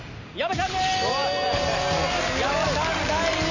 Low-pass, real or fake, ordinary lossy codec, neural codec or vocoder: 7.2 kHz; real; none; none